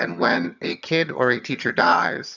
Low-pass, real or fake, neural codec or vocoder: 7.2 kHz; fake; vocoder, 22.05 kHz, 80 mel bands, HiFi-GAN